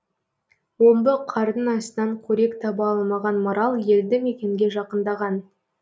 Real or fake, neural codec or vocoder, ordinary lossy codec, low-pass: real; none; none; none